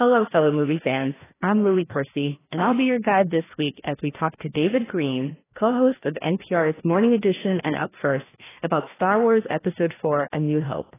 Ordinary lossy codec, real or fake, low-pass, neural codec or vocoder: AAC, 16 kbps; fake; 3.6 kHz; codec, 16 kHz, 1 kbps, FreqCodec, larger model